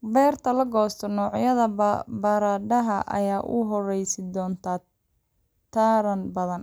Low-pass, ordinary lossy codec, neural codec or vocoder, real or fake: none; none; none; real